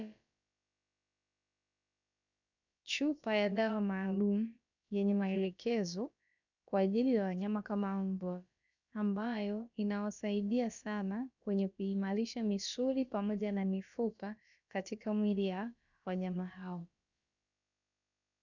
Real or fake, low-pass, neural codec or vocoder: fake; 7.2 kHz; codec, 16 kHz, about 1 kbps, DyCAST, with the encoder's durations